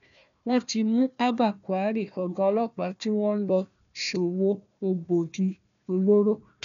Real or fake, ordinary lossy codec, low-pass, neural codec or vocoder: fake; none; 7.2 kHz; codec, 16 kHz, 1 kbps, FunCodec, trained on Chinese and English, 50 frames a second